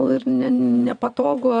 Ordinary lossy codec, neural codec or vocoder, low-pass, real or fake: AAC, 96 kbps; vocoder, 22.05 kHz, 80 mel bands, Vocos; 9.9 kHz; fake